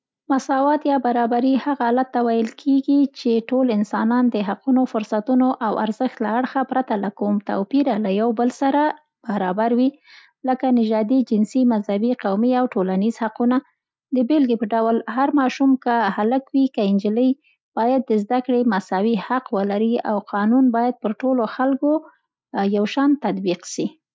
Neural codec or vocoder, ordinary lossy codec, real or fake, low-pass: none; none; real; none